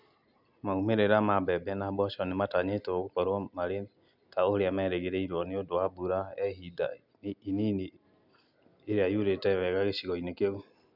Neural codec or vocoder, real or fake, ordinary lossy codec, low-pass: none; real; none; 5.4 kHz